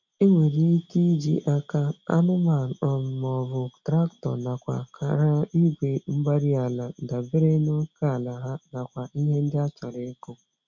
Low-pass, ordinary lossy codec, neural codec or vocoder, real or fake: 7.2 kHz; none; none; real